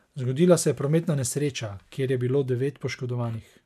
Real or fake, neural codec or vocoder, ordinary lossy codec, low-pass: real; none; none; 14.4 kHz